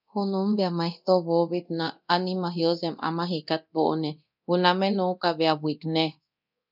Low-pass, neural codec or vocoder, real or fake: 5.4 kHz; codec, 24 kHz, 0.9 kbps, DualCodec; fake